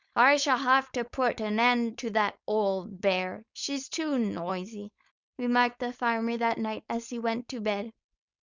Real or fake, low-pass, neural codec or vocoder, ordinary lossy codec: fake; 7.2 kHz; codec, 16 kHz, 4.8 kbps, FACodec; Opus, 64 kbps